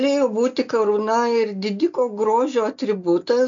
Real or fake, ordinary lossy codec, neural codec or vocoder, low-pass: real; MP3, 48 kbps; none; 7.2 kHz